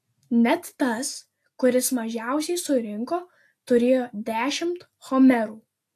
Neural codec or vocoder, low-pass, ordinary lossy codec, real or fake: none; 14.4 kHz; AAC, 64 kbps; real